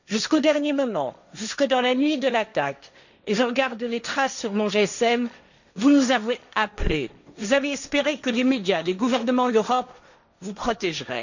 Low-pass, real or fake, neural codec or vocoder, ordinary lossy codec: 7.2 kHz; fake; codec, 16 kHz, 1.1 kbps, Voila-Tokenizer; none